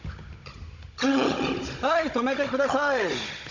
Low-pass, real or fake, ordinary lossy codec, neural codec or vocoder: 7.2 kHz; fake; none; codec, 16 kHz, 16 kbps, FunCodec, trained on Chinese and English, 50 frames a second